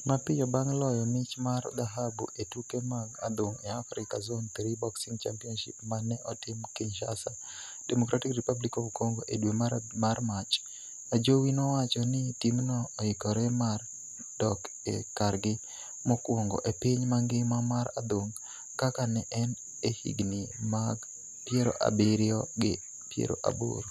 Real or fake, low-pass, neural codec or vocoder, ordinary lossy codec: real; 14.4 kHz; none; none